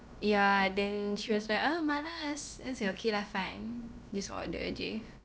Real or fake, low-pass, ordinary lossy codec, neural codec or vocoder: fake; none; none; codec, 16 kHz, about 1 kbps, DyCAST, with the encoder's durations